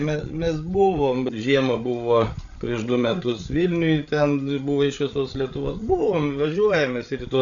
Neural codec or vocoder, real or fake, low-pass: codec, 16 kHz, 8 kbps, FreqCodec, larger model; fake; 7.2 kHz